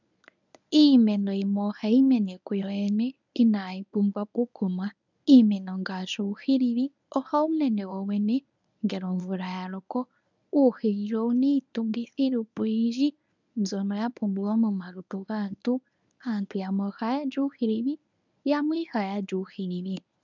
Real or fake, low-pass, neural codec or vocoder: fake; 7.2 kHz; codec, 24 kHz, 0.9 kbps, WavTokenizer, medium speech release version 1